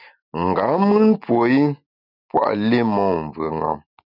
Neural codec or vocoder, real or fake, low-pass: none; real; 5.4 kHz